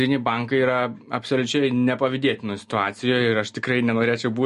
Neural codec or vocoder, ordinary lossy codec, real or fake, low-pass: none; MP3, 48 kbps; real; 14.4 kHz